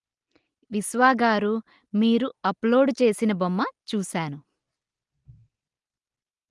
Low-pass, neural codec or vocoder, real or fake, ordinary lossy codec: 10.8 kHz; none; real; Opus, 24 kbps